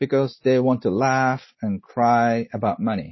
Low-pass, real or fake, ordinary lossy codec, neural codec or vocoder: 7.2 kHz; real; MP3, 24 kbps; none